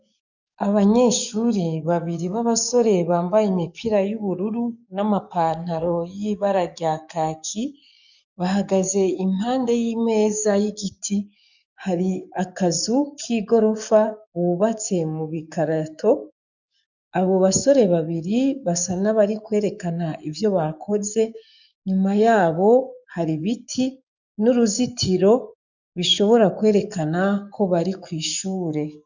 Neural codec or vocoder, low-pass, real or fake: codec, 44.1 kHz, 7.8 kbps, DAC; 7.2 kHz; fake